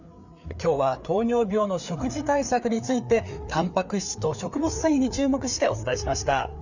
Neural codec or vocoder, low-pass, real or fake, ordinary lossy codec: codec, 16 kHz, 4 kbps, FreqCodec, larger model; 7.2 kHz; fake; none